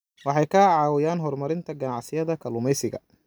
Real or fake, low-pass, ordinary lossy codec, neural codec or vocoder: real; none; none; none